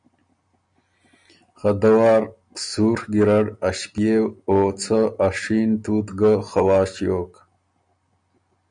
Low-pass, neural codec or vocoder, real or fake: 9.9 kHz; none; real